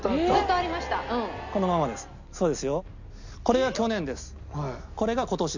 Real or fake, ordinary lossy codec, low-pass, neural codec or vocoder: real; none; 7.2 kHz; none